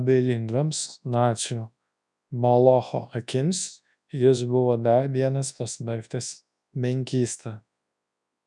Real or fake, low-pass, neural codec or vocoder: fake; 10.8 kHz; codec, 24 kHz, 0.9 kbps, WavTokenizer, large speech release